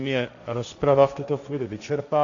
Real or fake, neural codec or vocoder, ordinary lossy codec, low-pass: fake; codec, 16 kHz, 1.1 kbps, Voila-Tokenizer; MP3, 64 kbps; 7.2 kHz